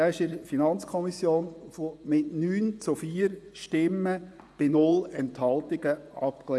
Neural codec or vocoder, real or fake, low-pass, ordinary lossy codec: vocoder, 24 kHz, 100 mel bands, Vocos; fake; none; none